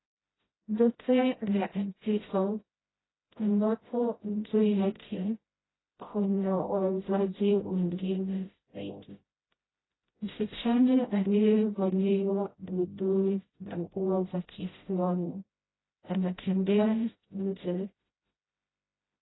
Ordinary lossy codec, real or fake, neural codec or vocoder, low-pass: AAC, 16 kbps; fake; codec, 16 kHz, 0.5 kbps, FreqCodec, smaller model; 7.2 kHz